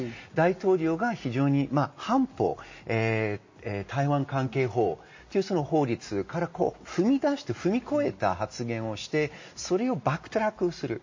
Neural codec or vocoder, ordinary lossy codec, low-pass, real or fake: none; MP3, 32 kbps; 7.2 kHz; real